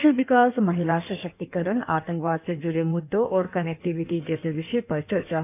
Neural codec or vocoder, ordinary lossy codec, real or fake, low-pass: codec, 16 kHz in and 24 kHz out, 1.1 kbps, FireRedTTS-2 codec; none; fake; 3.6 kHz